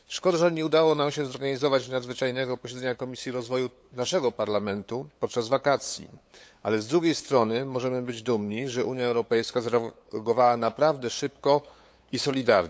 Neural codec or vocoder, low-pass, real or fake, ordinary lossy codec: codec, 16 kHz, 8 kbps, FunCodec, trained on LibriTTS, 25 frames a second; none; fake; none